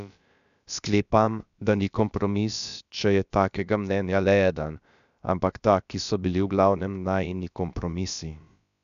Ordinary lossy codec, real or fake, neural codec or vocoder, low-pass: none; fake; codec, 16 kHz, about 1 kbps, DyCAST, with the encoder's durations; 7.2 kHz